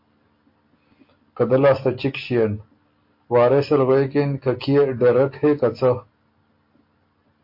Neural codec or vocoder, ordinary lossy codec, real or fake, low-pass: none; MP3, 32 kbps; real; 5.4 kHz